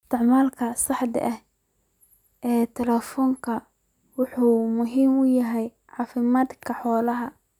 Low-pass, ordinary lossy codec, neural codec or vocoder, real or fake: 19.8 kHz; none; none; real